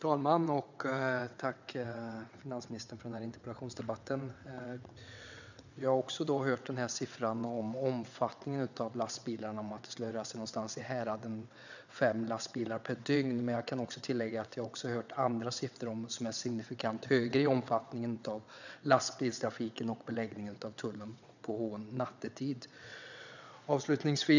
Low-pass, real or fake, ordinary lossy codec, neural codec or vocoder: 7.2 kHz; fake; none; vocoder, 22.05 kHz, 80 mel bands, WaveNeXt